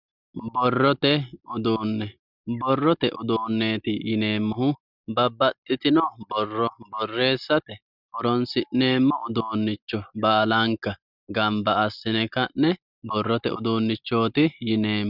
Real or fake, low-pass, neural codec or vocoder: real; 5.4 kHz; none